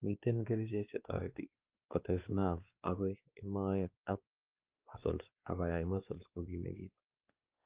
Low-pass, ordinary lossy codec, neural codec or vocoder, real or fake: 3.6 kHz; Opus, 24 kbps; codec, 16 kHz, 2 kbps, X-Codec, WavLM features, trained on Multilingual LibriSpeech; fake